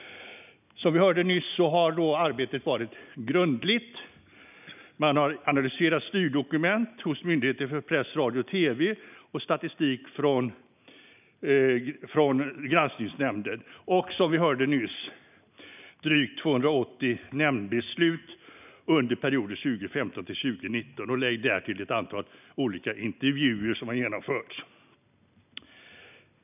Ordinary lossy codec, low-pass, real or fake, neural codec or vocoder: none; 3.6 kHz; real; none